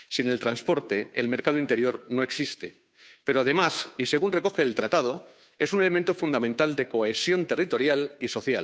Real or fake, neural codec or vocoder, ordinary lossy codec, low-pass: fake; codec, 16 kHz, 2 kbps, FunCodec, trained on Chinese and English, 25 frames a second; none; none